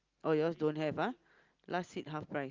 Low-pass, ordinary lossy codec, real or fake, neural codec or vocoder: 7.2 kHz; Opus, 24 kbps; real; none